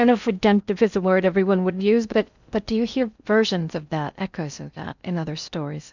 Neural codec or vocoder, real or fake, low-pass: codec, 16 kHz in and 24 kHz out, 0.6 kbps, FocalCodec, streaming, 4096 codes; fake; 7.2 kHz